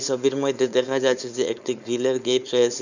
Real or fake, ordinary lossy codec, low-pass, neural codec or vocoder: fake; none; 7.2 kHz; codec, 16 kHz, 4.8 kbps, FACodec